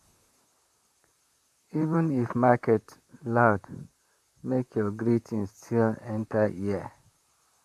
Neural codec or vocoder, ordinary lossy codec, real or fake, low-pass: vocoder, 44.1 kHz, 128 mel bands, Pupu-Vocoder; AAC, 96 kbps; fake; 14.4 kHz